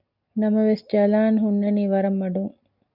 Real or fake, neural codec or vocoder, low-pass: real; none; 5.4 kHz